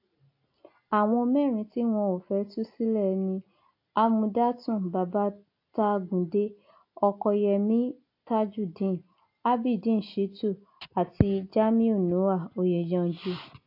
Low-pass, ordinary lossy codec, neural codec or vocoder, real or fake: 5.4 kHz; AAC, 32 kbps; none; real